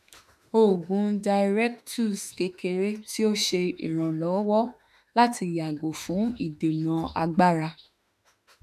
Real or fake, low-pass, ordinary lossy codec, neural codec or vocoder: fake; 14.4 kHz; none; autoencoder, 48 kHz, 32 numbers a frame, DAC-VAE, trained on Japanese speech